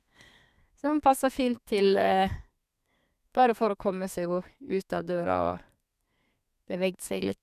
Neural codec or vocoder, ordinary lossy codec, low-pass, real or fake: codec, 32 kHz, 1.9 kbps, SNAC; none; 14.4 kHz; fake